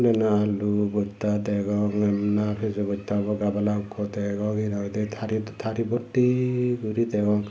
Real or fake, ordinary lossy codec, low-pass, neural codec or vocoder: real; none; none; none